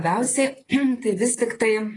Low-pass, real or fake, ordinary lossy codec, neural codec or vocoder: 10.8 kHz; fake; AAC, 32 kbps; vocoder, 44.1 kHz, 128 mel bands, Pupu-Vocoder